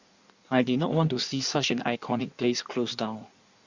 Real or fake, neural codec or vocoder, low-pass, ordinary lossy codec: fake; codec, 16 kHz in and 24 kHz out, 1.1 kbps, FireRedTTS-2 codec; 7.2 kHz; Opus, 64 kbps